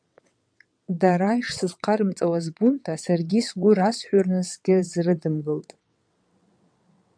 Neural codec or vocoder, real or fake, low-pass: vocoder, 22.05 kHz, 80 mel bands, WaveNeXt; fake; 9.9 kHz